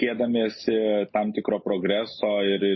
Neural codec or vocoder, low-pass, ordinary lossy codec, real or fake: none; 7.2 kHz; MP3, 24 kbps; real